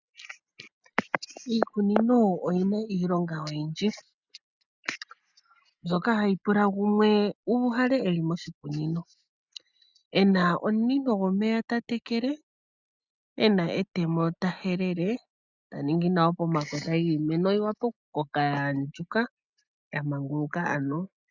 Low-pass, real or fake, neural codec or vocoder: 7.2 kHz; real; none